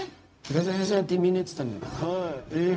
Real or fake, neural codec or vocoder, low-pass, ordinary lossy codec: fake; codec, 16 kHz, 0.4 kbps, LongCat-Audio-Codec; none; none